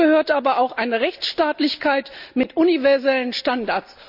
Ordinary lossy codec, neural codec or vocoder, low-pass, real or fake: AAC, 48 kbps; none; 5.4 kHz; real